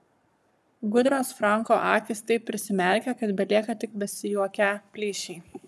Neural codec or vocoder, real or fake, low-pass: codec, 44.1 kHz, 7.8 kbps, Pupu-Codec; fake; 14.4 kHz